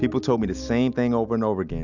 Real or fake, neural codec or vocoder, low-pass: real; none; 7.2 kHz